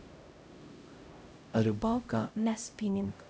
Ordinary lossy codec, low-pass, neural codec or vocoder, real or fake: none; none; codec, 16 kHz, 0.5 kbps, X-Codec, HuBERT features, trained on LibriSpeech; fake